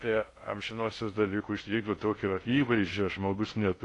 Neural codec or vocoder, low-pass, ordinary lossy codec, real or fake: codec, 16 kHz in and 24 kHz out, 0.6 kbps, FocalCodec, streaming, 2048 codes; 10.8 kHz; AAC, 48 kbps; fake